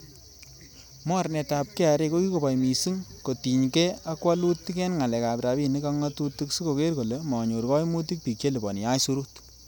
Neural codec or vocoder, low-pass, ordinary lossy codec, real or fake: none; none; none; real